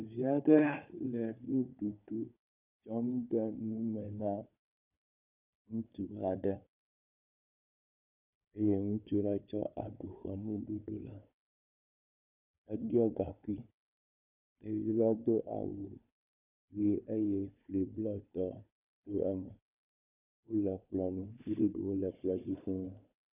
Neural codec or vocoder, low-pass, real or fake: codec, 16 kHz, 4 kbps, FunCodec, trained on Chinese and English, 50 frames a second; 3.6 kHz; fake